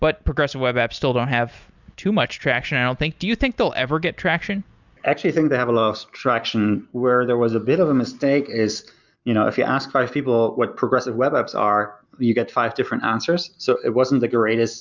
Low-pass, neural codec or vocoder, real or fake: 7.2 kHz; none; real